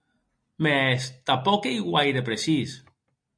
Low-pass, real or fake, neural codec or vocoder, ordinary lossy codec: 9.9 kHz; real; none; MP3, 48 kbps